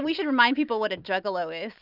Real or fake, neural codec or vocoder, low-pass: fake; vocoder, 44.1 kHz, 128 mel bands every 256 samples, BigVGAN v2; 5.4 kHz